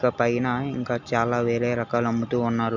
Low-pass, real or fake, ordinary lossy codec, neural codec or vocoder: 7.2 kHz; real; none; none